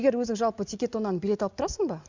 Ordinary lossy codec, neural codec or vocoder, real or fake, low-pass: Opus, 64 kbps; none; real; 7.2 kHz